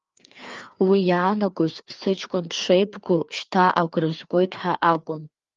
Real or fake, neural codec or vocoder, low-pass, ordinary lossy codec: fake; codec, 16 kHz, 2 kbps, FreqCodec, larger model; 7.2 kHz; Opus, 32 kbps